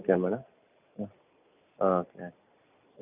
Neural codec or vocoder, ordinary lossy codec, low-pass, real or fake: none; none; 3.6 kHz; real